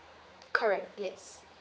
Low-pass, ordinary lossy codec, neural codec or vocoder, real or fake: none; none; codec, 16 kHz, 4 kbps, X-Codec, HuBERT features, trained on general audio; fake